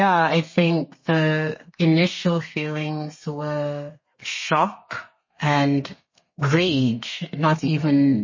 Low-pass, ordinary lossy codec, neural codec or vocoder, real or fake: 7.2 kHz; MP3, 32 kbps; codec, 32 kHz, 1.9 kbps, SNAC; fake